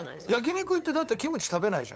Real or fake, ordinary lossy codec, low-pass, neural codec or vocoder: fake; none; none; codec, 16 kHz, 8 kbps, FunCodec, trained on LibriTTS, 25 frames a second